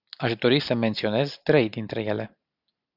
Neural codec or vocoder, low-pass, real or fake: none; 5.4 kHz; real